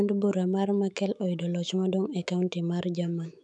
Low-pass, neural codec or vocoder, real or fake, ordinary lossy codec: 10.8 kHz; autoencoder, 48 kHz, 128 numbers a frame, DAC-VAE, trained on Japanese speech; fake; MP3, 96 kbps